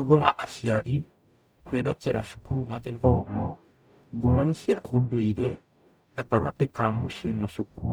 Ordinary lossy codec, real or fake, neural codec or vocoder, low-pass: none; fake; codec, 44.1 kHz, 0.9 kbps, DAC; none